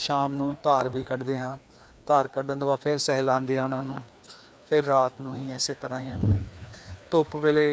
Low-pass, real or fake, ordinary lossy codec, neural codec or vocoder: none; fake; none; codec, 16 kHz, 2 kbps, FreqCodec, larger model